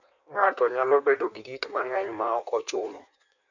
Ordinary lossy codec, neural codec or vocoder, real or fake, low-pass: none; codec, 16 kHz in and 24 kHz out, 1.1 kbps, FireRedTTS-2 codec; fake; 7.2 kHz